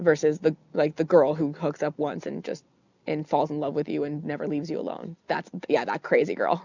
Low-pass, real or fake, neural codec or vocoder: 7.2 kHz; real; none